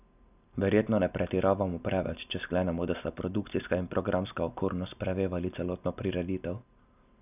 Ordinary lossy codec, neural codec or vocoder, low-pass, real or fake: none; none; 3.6 kHz; real